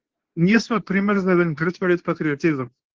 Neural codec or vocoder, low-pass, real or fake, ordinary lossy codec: codec, 24 kHz, 0.9 kbps, WavTokenizer, medium speech release version 2; 7.2 kHz; fake; Opus, 16 kbps